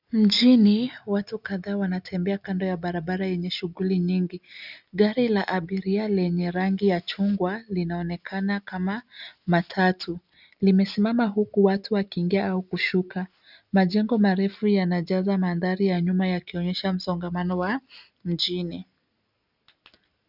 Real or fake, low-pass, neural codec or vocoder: real; 5.4 kHz; none